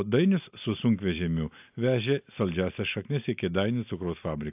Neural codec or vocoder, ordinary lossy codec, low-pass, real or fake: none; AAC, 32 kbps; 3.6 kHz; real